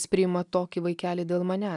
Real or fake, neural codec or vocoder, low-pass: real; none; 10.8 kHz